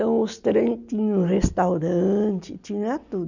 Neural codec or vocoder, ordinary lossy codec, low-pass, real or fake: none; none; 7.2 kHz; real